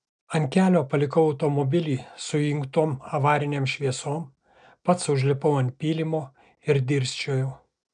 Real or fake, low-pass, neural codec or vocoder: real; 9.9 kHz; none